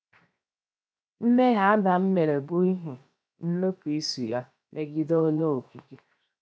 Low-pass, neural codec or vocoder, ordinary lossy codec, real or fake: none; codec, 16 kHz, 0.7 kbps, FocalCodec; none; fake